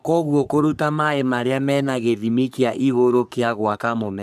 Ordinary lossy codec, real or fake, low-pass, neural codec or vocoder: none; fake; 14.4 kHz; codec, 44.1 kHz, 3.4 kbps, Pupu-Codec